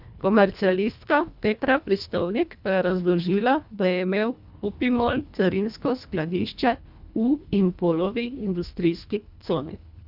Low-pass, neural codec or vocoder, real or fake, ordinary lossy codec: 5.4 kHz; codec, 24 kHz, 1.5 kbps, HILCodec; fake; none